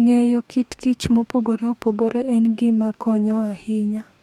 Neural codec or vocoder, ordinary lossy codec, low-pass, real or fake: codec, 44.1 kHz, 2.6 kbps, DAC; none; 19.8 kHz; fake